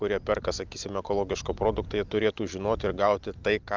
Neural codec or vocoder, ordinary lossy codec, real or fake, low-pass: none; Opus, 32 kbps; real; 7.2 kHz